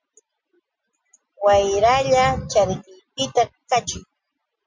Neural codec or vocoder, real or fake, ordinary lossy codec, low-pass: none; real; MP3, 48 kbps; 7.2 kHz